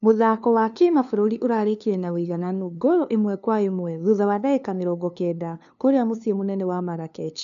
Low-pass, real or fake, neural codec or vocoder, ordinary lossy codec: 7.2 kHz; fake; codec, 16 kHz, 2 kbps, FunCodec, trained on LibriTTS, 25 frames a second; none